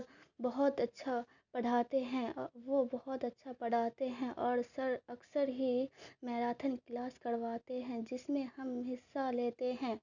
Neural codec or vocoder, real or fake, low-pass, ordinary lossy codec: none; real; 7.2 kHz; none